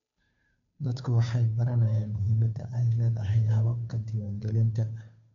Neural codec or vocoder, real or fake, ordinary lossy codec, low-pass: codec, 16 kHz, 2 kbps, FunCodec, trained on Chinese and English, 25 frames a second; fake; none; 7.2 kHz